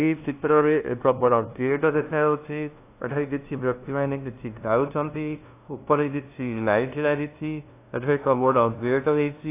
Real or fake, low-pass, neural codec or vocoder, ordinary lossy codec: fake; 3.6 kHz; codec, 16 kHz, 0.5 kbps, FunCodec, trained on LibriTTS, 25 frames a second; AAC, 24 kbps